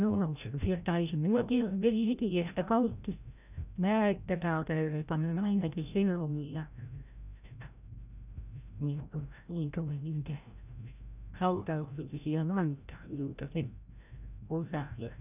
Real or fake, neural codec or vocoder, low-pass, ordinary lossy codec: fake; codec, 16 kHz, 0.5 kbps, FreqCodec, larger model; 3.6 kHz; none